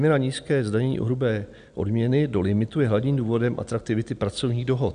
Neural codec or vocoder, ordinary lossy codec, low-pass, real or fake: none; AAC, 96 kbps; 9.9 kHz; real